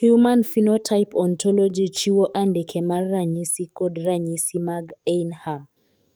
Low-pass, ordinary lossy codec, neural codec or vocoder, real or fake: none; none; codec, 44.1 kHz, 7.8 kbps, DAC; fake